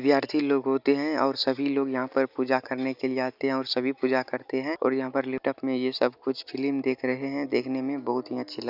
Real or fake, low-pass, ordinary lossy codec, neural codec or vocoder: real; 5.4 kHz; none; none